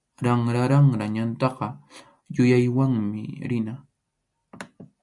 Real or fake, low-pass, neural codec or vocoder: real; 10.8 kHz; none